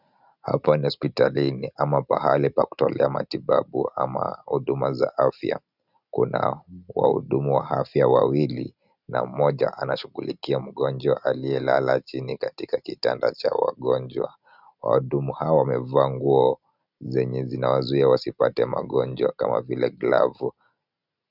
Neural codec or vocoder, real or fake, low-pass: none; real; 5.4 kHz